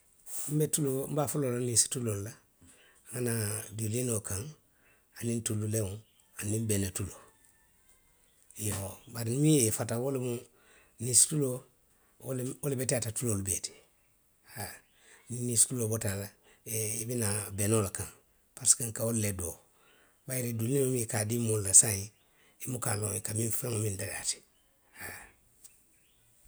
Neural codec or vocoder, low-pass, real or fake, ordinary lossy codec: none; none; real; none